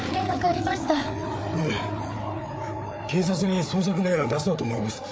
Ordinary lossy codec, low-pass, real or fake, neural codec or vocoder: none; none; fake; codec, 16 kHz, 4 kbps, FreqCodec, larger model